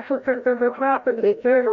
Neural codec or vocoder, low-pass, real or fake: codec, 16 kHz, 0.5 kbps, FreqCodec, larger model; 7.2 kHz; fake